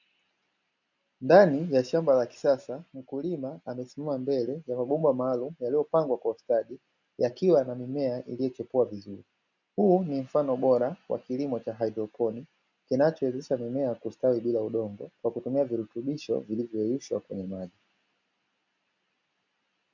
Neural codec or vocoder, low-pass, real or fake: none; 7.2 kHz; real